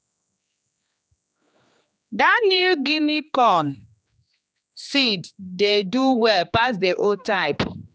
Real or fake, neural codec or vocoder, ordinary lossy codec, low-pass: fake; codec, 16 kHz, 2 kbps, X-Codec, HuBERT features, trained on general audio; none; none